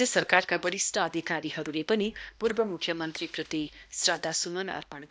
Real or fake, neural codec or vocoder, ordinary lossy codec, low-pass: fake; codec, 16 kHz, 1 kbps, X-Codec, HuBERT features, trained on balanced general audio; none; none